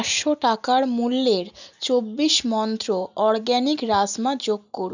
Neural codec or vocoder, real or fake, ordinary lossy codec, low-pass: none; real; none; 7.2 kHz